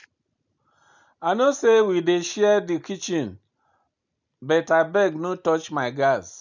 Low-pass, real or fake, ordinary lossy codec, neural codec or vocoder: 7.2 kHz; real; none; none